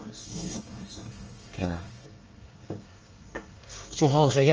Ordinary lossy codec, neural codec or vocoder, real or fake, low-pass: Opus, 24 kbps; codec, 24 kHz, 1 kbps, SNAC; fake; 7.2 kHz